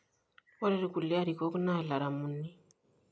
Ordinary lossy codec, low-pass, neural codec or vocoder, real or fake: none; none; none; real